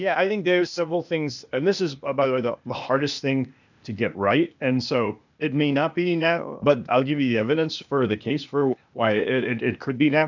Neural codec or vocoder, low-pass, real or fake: codec, 16 kHz, 0.8 kbps, ZipCodec; 7.2 kHz; fake